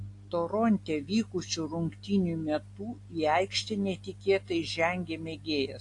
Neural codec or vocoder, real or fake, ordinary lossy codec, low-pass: none; real; AAC, 48 kbps; 10.8 kHz